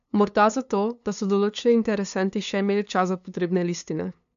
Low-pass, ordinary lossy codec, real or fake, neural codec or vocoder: 7.2 kHz; none; fake; codec, 16 kHz, 2 kbps, FunCodec, trained on LibriTTS, 25 frames a second